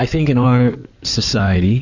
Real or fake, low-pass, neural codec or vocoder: fake; 7.2 kHz; codec, 16 kHz in and 24 kHz out, 2.2 kbps, FireRedTTS-2 codec